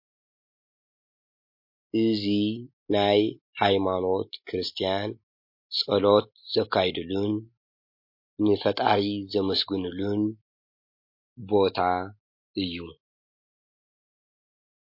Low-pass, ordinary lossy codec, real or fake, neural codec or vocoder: 5.4 kHz; MP3, 32 kbps; real; none